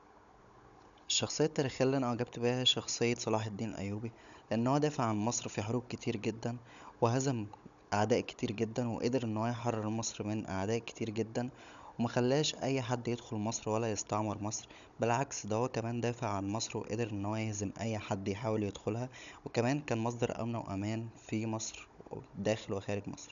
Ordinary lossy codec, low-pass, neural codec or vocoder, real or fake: none; 7.2 kHz; codec, 16 kHz, 16 kbps, FunCodec, trained on Chinese and English, 50 frames a second; fake